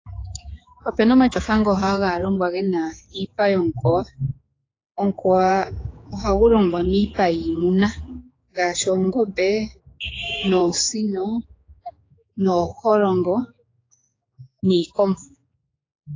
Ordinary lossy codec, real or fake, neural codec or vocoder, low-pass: AAC, 32 kbps; fake; codec, 16 kHz, 4 kbps, X-Codec, HuBERT features, trained on general audio; 7.2 kHz